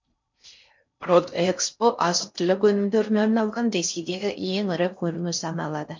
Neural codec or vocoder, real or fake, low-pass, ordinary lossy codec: codec, 16 kHz in and 24 kHz out, 0.6 kbps, FocalCodec, streaming, 4096 codes; fake; 7.2 kHz; MP3, 48 kbps